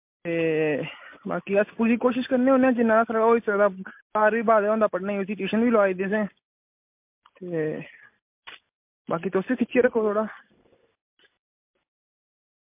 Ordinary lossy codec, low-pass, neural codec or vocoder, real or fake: MP3, 32 kbps; 3.6 kHz; none; real